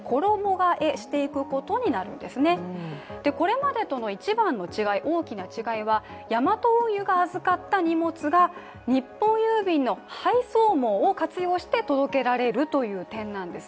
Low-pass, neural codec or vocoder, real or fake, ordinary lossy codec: none; none; real; none